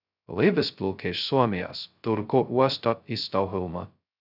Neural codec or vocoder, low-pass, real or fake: codec, 16 kHz, 0.2 kbps, FocalCodec; 5.4 kHz; fake